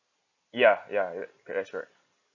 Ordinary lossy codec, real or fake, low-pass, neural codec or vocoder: none; real; 7.2 kHz; none